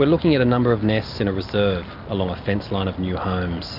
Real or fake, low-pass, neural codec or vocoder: real; 5.4 kHz; none